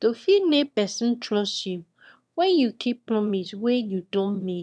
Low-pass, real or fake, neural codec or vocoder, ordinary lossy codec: none; fake; autoencoder, 22.05 kHz, a latent of 192 numbers a frame, VITS, trained on one speaker; none